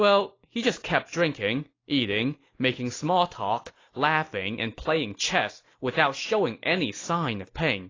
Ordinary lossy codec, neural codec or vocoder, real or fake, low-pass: AAC, 32 kbps; none; real; 7.2 kHz